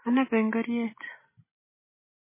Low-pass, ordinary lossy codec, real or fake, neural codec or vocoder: 3.6 kHz; MP3, 16 kbps; real; none